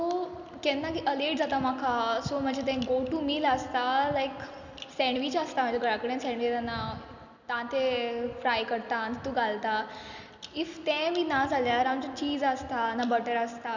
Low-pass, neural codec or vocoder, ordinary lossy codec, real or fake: 7.2 kHz; none; none; real